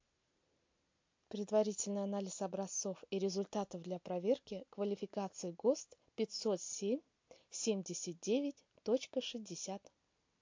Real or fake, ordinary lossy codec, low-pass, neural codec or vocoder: real; MP3, 48 kbps; 7.2 kHz; none